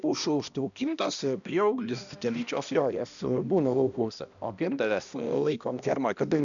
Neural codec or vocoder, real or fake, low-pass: codec, 16 kHz, 1 kbps, X-Codec, HuBERT features, trained on balanced general audio; fake; 7.2 kHz